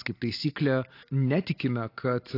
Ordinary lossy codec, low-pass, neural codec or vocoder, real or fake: AAC, 32 kbps; 5.4 kHz; none; real